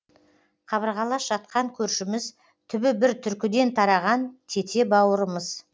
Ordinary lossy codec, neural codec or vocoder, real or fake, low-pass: none; none; real; none